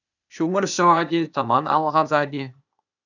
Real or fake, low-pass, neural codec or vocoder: fake; 7.2 kHz; codec, 16 kHz, 0.8 kbps, ZipCodec